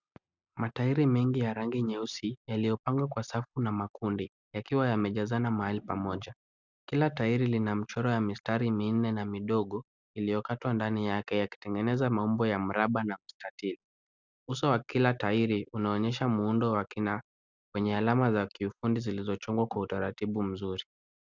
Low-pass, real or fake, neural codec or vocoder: 7.2 kHz; real; none